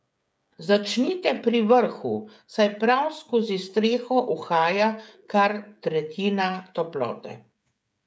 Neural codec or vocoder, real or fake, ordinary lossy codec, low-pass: codec, 16 kHz, 16 kbps, FreqCodec, smaller model; fake; none; none